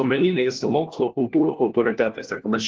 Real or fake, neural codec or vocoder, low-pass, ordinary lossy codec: fake; codec, 16 kHz, 1 kbps, FunCodec, trained on LibriTTS, 50 frames a second; 7.2 kHz; Opus, 16 kbps